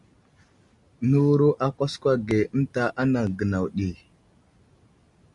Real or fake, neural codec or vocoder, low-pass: real; none; 10.8 kHz